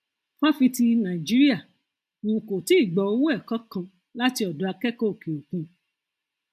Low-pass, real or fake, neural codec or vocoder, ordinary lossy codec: 14.4 kHz; real; none; MP3, 96 kbps